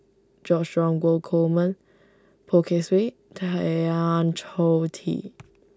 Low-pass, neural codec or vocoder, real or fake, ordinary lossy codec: none; none; real; none